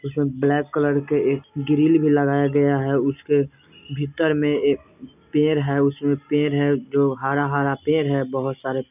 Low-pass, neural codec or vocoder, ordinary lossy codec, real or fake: 3.6 kHz; none; none; real